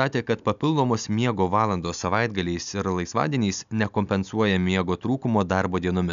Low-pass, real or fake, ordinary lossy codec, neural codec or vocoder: 7.2 kHz; real; MP3, 96 kbps; none